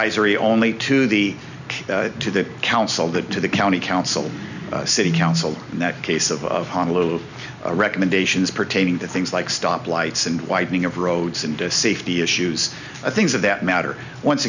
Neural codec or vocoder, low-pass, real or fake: none; 7.2 kHz; real